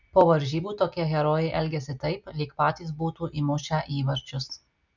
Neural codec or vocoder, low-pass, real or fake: none; 7.2 kHz; real